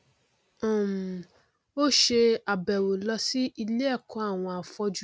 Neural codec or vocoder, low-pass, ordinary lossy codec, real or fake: none; none; none; real